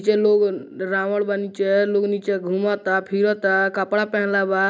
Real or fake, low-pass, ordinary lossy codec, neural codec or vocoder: real; none; none; none